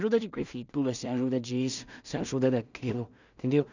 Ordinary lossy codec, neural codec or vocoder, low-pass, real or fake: none; codec, 16 kHz in and 24 kHz out, 0.4 kbps, LongCat-Audio-Codec, two codebook decoder; 7.2 kHz; fake